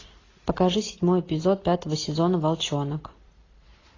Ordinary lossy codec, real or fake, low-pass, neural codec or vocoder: AAC, 32 kbps; real; 7.2 kHz; none